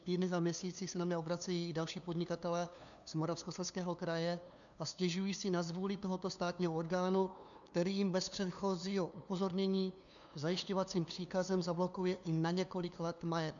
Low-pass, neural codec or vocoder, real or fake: 7.2 kHz; codec, 16 kHz, 2 kbps, FunCodec, trained on LibriTTS, 25 frames a second; fake